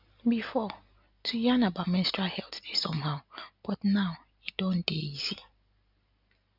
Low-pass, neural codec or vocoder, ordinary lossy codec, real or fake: 5.4 kHz; none; none; real